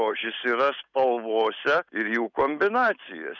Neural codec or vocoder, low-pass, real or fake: none; 7.2 kHz; real